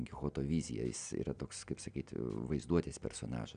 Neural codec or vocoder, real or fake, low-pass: none; real; 9.9 kHz